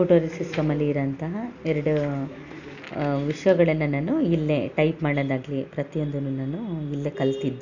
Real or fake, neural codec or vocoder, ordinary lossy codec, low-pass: real; none; none; 7.2 kHz